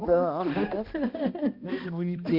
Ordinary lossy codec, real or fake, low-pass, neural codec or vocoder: Opus, 64 kbps; fake; 5.4 kHz; codec, 16 kHz, 1 kbps, X-Codec, HuBERT features, trained on balanced general audio